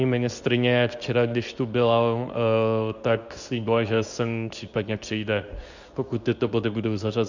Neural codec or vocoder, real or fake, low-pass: codec, 24 kHz, 0.9 kbps, WavTokenizer, medium speech release version 2; fake; 7.2 kHz